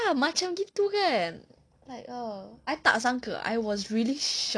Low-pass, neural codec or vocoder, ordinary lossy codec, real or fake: 9.9 kHz; none; none; real